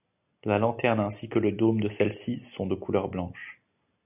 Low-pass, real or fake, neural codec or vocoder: 3.6 kHz; real; none